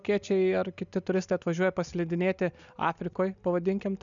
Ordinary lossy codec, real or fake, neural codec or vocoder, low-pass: MP3, 96 kbps; real; none; 7.2 kHz